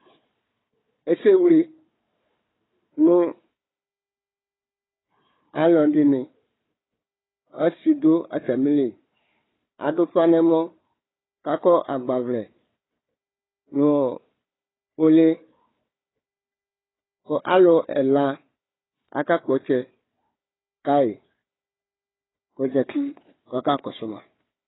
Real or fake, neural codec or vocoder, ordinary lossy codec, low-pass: fake; codec, 16 kHz, 4 kbps, FunCodec, trained on Chinese and English, 50 frames a second; AAC, 16 kbps; 7.2 kHz